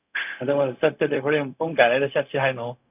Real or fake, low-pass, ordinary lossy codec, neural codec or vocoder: fake; 3.6 kHz; none; codec, 16 kHz, 0.4 kbps, LongCat-Audio-Codec